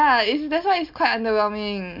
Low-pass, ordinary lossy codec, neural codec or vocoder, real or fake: 5.4 kHz; MP3, 48 kbps; none; real